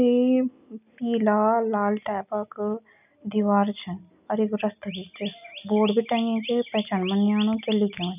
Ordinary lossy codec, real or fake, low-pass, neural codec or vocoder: none; real; 3.6 kHz; none